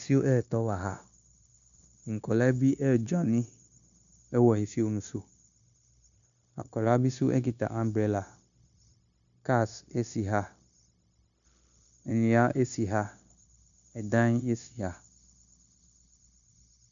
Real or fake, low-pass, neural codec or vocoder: fake; 7.2 kHz; codec, 16 kHz, 0.9 kbps, LongCat-Audio-Codec